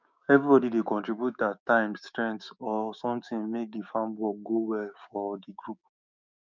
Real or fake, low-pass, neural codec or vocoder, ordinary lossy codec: fake; 7.2 kHz; codec, 16 kHz, 6 kbps, DAC; none